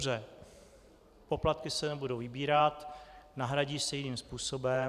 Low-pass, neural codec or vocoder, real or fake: 14.4 kHz; vocoder, 44.1 kHz, 128 mel bands every 512 samples, BigVGAN v2; fake